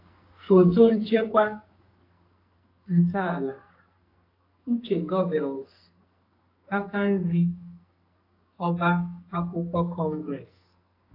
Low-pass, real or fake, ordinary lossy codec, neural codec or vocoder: 5.4 kHz; fake; AAC, 32 kbps; codec, 44.1 kHz, 2.6 kbps, SNAC